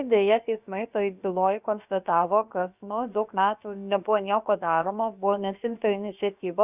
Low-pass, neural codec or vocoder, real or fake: 3.6 kHz; codec, 16 kHz, about 1 kbps, DyCAST, with the encoder's durations; fake